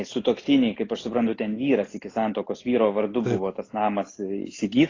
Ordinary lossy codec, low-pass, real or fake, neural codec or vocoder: AAC, 32 kbps; 7.2 kHz; real; none